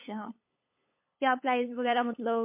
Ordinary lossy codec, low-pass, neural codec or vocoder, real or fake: MP3, 24 kbps; 3.6 kHz; codec, 16 kHz, 8 kbps, FunCodec, trained on LibriTTS, 25 frames a second; fake